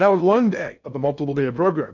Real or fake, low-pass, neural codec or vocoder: fake; 7.2 kHz; codec, 16 kHz in and 24 kHz out, 0.6 kbps, FocalCodec, streaming, 2048 codes